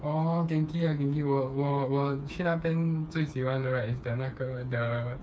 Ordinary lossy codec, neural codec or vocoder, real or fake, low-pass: none; codec, 16 kHz, 4 kbps, FreqCodec, smaller model; fake; none